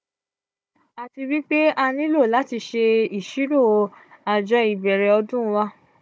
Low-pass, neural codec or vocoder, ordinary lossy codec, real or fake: none; codec, 16 kHz, 16 kbps, FunCodec, trained on Chinese and English, 50 frames a second; none; fake